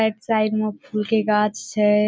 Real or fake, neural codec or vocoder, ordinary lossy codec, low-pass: real; none; none; none